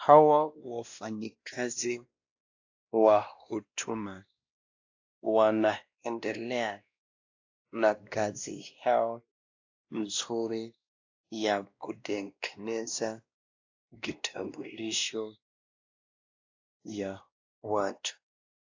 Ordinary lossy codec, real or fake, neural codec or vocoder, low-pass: AAC, 48 kbps; fake; codec, 16 kHz, 1 kbps, X-Codec, WavLM features, trained on Multilingual LibriSpeech; 7.2 kHz